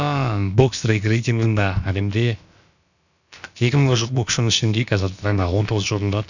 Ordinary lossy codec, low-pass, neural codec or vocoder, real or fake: none; 7.2 kHz; codec, 16 kHz, about 1 kbps, DyCAST, with the encoder's durations; fake